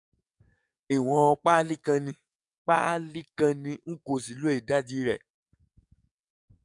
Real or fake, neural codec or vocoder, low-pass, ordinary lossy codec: fake; codec, 44.1 kHz, 7.8 kbps, DAC; 10.8 kHz; none